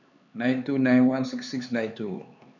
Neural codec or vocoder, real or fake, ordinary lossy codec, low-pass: codec, 16 kHz, 4 kbps, X-Codec, HuBERT features, trained on LibriSpeech; fake; none; 7.2 kHz